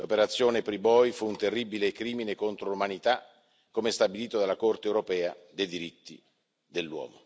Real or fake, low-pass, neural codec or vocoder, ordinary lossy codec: real; none; none; none